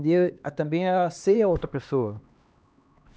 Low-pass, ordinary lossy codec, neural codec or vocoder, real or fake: none; none; codec, 16 kHz, 2 kbps, X-Codec, HuBERT features, trained on LibriSpeech; fake